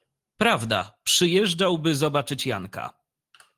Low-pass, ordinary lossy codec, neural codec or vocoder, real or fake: 14.4 kHz; Opus, 24 kbps; none; real